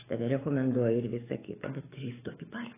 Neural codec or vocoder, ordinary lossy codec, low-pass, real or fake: codec, 44.1 kHz, 7.8 kbps, DAC; AAC, 16 kbps; 3.6 kHz; fake